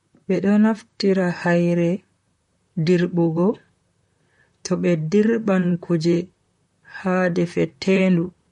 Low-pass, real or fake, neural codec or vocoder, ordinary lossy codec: 19.8 kHz; fake; vocoder, 44.1 kHz, 128 mel bands, Pupu-Vocoder; MP3, 48 kbps